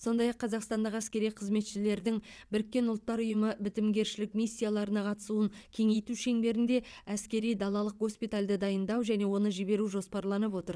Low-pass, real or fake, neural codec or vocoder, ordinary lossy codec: none; fake; vocoder, 22.05 kHz, 80 mel bands, WaveNeXt; none